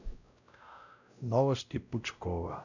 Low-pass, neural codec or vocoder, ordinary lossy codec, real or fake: 7.2 kHz; codec, 16 kHz, 0.5 kbps, X-Codec, WavLM features, trained on Multilingual LibriSpeech; none; fake